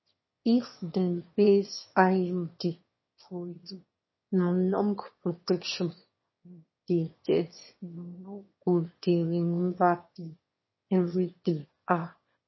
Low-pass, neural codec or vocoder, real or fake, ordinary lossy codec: 7.2 kHz; autoencoder, 22.05 kHz, a latent of 192 numbers a frame, VITS, trained on one speaker; fake; MP3, 24 kbps